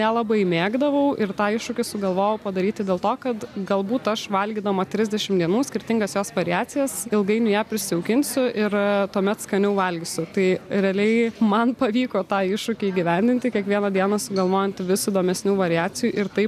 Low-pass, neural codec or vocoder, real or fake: 14.4 kHz; none; real